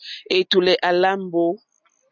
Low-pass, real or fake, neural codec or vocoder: 7.2 kHz; real; none